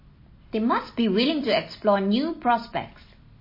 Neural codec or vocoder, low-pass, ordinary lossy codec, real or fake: none; 5.4 kHz; MP3, 24 kbps; real